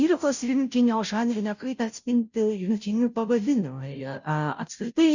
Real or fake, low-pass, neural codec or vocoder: fake; 7.2 kHz; codec, 16 kHz, 0.5 kbps, FunCodec, trained on Chinese and English, 25 frames a second